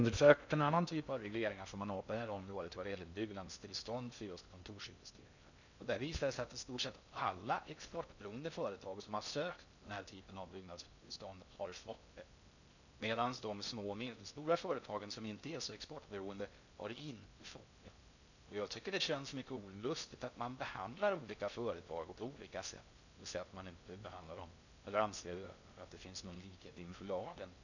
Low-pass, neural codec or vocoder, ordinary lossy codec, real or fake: 7.2 kHz; codec, 16 kHz in and 24 kHz out, 0.6 kbps, FocalCodec, streaming, 2048 codes; none; fake